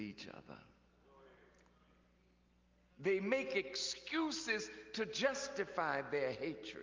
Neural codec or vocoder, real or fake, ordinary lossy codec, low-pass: none; real; Opus, 24 kbps; 7.2 kHz